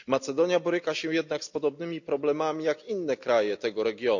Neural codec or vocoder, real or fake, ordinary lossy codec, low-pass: none; real; none; 7.2 kHz